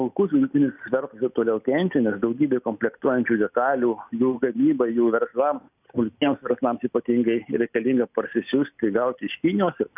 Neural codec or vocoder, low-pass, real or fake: none; 3.6 kHz; real